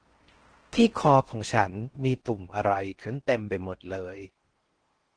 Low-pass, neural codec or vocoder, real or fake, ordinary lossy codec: 9.9 kHz; codec, 16 kHz in and 24 kHz out, 0.6 kbps, FocalCodec, streaming, 4096 codes; fake; Opus, 16 kbps